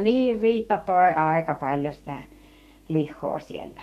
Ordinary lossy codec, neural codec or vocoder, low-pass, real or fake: MP3, 64 kbps; codec, 32 kHz, 1.9 kbps, SNAC; 14.4 kHz; fake